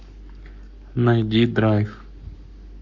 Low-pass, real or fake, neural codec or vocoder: 7.2 kHz; fake; codec, 44.1 kHz, 7.8 kbps, Pupu-Codec